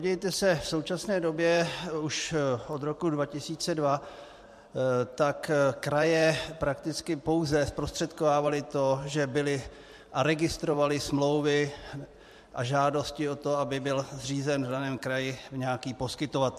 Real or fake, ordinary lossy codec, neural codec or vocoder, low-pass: fake; MP3, 64 kbps; vocoder, 44.1 kHz, 128 mel bands every 512 samples, BigVGAN v2; 14.4 kHz